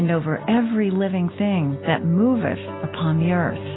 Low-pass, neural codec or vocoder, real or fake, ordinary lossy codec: 7.2 kHz; none; real; AAC, 16 kbps